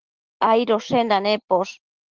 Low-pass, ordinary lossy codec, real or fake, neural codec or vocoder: 7.2 kHz; Opus, 16 kbps; real; none